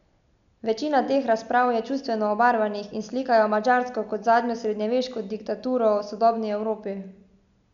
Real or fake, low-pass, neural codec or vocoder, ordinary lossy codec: real; 7.2 kHz; none; none